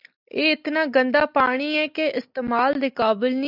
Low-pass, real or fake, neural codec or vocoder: 5.4 kHz; real; none